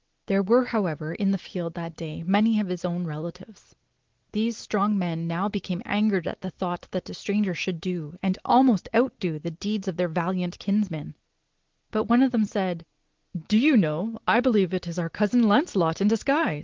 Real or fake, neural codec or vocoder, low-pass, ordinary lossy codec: real; none; 7.2 kHz; Opus, 16 kbps